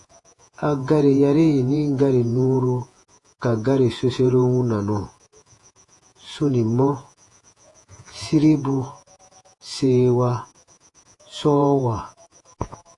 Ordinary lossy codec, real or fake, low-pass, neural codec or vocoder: AAC, 48 kbps; fake; 10.8 kHz; vocoder, 48 kHz, 128 mel bands, Vocos